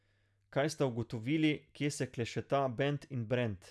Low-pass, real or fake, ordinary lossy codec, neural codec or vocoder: none; real; none; none